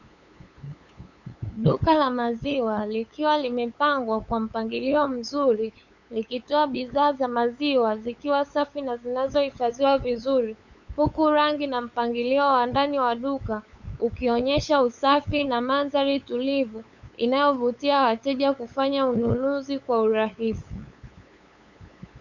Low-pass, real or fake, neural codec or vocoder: 7.2 kHz; fake; codec, 16 kHz, 8 kbps, FunCodec, trained on LibriTTS, 25 frames a second